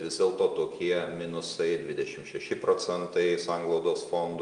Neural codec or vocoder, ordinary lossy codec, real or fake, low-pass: none; Opus, 32 kbps; real; 9.9 kHz